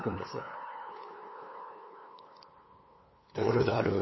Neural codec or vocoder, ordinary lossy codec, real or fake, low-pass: codec, 16 kHz, 8 kbps, FunCodec, trained on LibriTTS, 25 frames a second; MP3, 24 kbps; fake; 7.2 kHz